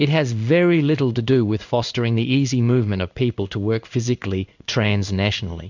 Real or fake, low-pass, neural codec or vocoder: fake; 7.2 kHz; codec, 16 kHz in and 24 kHz out, 1 kbps, XY-Tokenizer